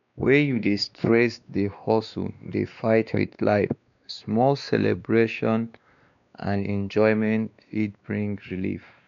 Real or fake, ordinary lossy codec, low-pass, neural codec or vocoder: fake; none; 7.2 kHz; codec, 16 kHz, 2 kbps, X-Codec, WavLM features, trained on Multilingual LibriSpeech